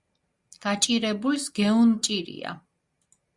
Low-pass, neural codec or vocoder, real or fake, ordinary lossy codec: 10.8 kHz; none; real; Opus, 64 kbps